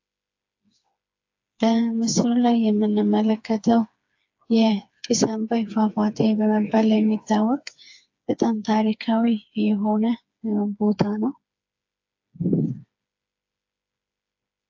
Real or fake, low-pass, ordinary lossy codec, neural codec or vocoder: fake; 7.2 kHz; AAC, 48 kbps; codec, 16 kHz, 4 kbps, FreqCodec, smaller model